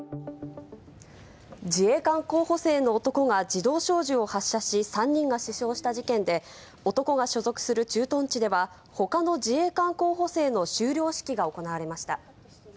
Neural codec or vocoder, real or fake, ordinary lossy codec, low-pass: none; real; none; none